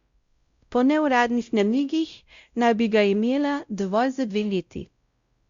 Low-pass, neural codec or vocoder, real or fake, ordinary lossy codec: 7.2 kHz; codec, 16 kHz, 0.5 kbps, X-Codec, WavLM features, trained on Multilingual LibriSpeech; fake; Opus, 64 kbps